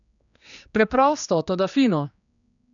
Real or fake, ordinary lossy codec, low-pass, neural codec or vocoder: fake; none; 7.2 kHz; codec, 16 kHz, 2 kbps, X-Codec, HuBERT features, trained on general audio